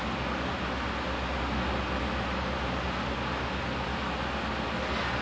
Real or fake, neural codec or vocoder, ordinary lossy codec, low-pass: real; none; none; none